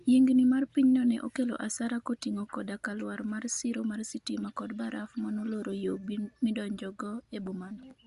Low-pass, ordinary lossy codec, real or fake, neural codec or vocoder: 10.8 kHz; Opus, 64 kbps; real; none